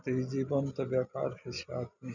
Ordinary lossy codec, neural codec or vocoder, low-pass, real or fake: none; none; 7.2 kHz; real